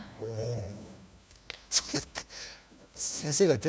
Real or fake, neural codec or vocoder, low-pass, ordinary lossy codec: fake; codec, 16 kHz, 1 kbps, FunCodec, trained on LibriTTS, 50 frames a second; none; none